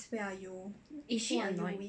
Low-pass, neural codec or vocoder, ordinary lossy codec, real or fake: 9.9 kHz; none; none; real